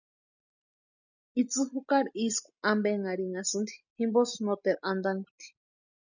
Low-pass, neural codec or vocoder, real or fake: 7.2 kHz; none; real